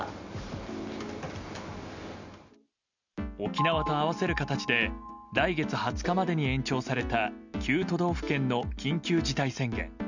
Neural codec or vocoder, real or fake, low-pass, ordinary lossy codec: none; real; 7.2 kHz; none